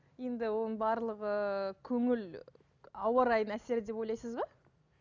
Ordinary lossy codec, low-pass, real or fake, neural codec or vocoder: none; 7.2 kHz; real; none